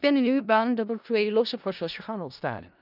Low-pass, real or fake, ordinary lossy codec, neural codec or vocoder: 5.4 kHz; fake; none; codec, 16 kHz in and 24 kHz out, 0.4 kbps, LongCat-Audio-Codec, four codebook decoder